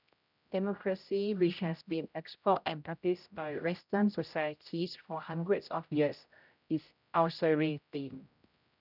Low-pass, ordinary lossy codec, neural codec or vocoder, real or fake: 5.4 kHz; none; codec, 16 kHz, 0.5 kbps, X-Codec, HuBERT features, trained on general audio; fake